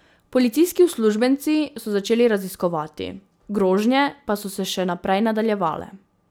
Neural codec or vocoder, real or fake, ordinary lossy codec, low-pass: none; real; none; none